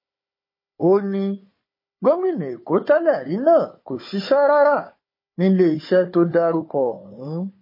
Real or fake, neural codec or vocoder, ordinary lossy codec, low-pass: fake; codec, 16 kHz, 4 kbps, FunCodec, trained on Chinese and English, 50 frames a second; MP3, 24 kbps; 5.4 kHz